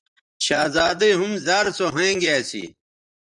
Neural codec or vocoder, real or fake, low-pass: vocoder, 44.1 kHz, 128 mel bands, Pupu-Vocoder; fake; 10.8 kHz